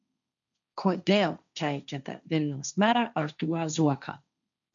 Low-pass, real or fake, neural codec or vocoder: 7.2 kHz; fake; codec, 16 kHz, 1.1 kbps, Voila-Tokenizer